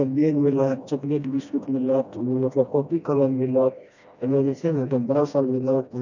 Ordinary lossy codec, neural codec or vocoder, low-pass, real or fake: none; codec, 16 kHz, 1 kbps, FreqCodec, smaller model; 7.2 kHz; fake